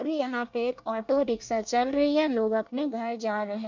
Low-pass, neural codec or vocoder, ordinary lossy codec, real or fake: 7.2 kHz; codec, 24 kHz, 1 kbps, SNAC; MP3, 64 kbps; fake